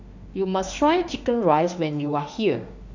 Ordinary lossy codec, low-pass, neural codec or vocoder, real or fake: none; 7.2 kHz; autoencoder, 48 kHz, 32 numbers a frame, DAC-VAE, trained on Japanese speech; fake